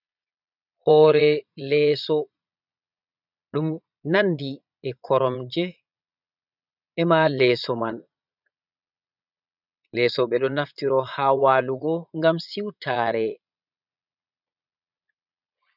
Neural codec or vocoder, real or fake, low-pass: vocoder, 22.05 kHz, 80 mel bands, Vocos; fake; 5.4 kHz